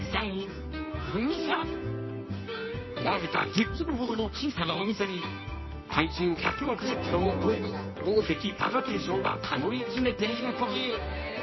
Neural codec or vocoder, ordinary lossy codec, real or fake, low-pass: codec, 24 kHz, 0.9 kbps, WavTokenizer, medium music audio release; MP3, 24 kbps; fake; 7.2 kHz